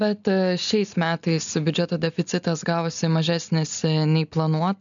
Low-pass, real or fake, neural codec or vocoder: 7.2 kHz; real; none